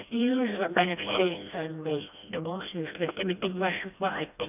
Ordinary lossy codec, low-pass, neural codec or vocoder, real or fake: none; 3.6 kHz; codec, 16 kHz, 1 kbps, FreqCodec, smaller model; fake